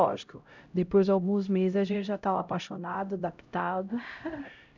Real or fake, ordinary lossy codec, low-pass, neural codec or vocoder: fake; none; 7.2 kHz; codec, 16 kHz, 0.5 kbps, X-Codec, HuBERT features, trained on LibriSpeech